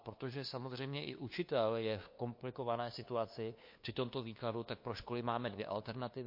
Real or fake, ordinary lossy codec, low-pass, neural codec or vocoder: fake; MP3, 32 kbps; 5.4 kHz; codec, 16 kHz, 2 kbps, FunCodec, trained on LibriTTS, 25 frames a second